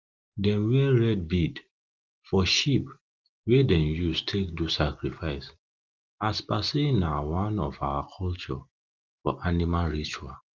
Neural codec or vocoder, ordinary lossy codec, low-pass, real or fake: none; Opus, 32 kbps; 7.2 kHz; real